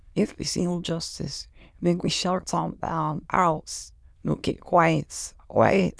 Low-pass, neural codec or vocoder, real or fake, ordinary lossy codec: none; autoencoder, 22.05 kHz, a latent of 192 numbers a frame, VITS, trained on many speakers; fake; none